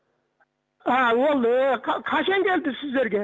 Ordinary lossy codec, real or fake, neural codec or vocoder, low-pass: none; real; none; none